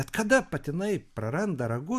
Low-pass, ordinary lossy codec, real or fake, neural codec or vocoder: 14.4 kHz; MP3, 96 kbps; real; none